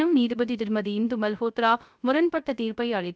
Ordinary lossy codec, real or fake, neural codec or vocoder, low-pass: none; fake; codec, 16 kHz, 0.3 kbps, FocalCodec; none